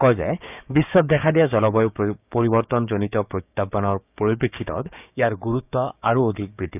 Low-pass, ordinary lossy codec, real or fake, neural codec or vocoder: 3.6 kHz; none; fake; codec, 16 kHz, 6 kbps, DAC